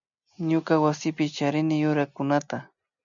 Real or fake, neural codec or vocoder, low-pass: real; none; 7.2 kHz